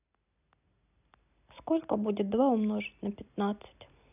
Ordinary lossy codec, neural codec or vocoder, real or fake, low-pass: none; none; real; 3.6 kHz